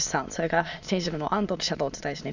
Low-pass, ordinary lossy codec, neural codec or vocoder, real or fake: 7.2 kHz; none; autoencoder, 22.05 kHz, a latent of 192 numbers a frame, VITS, trained on many speakers; fake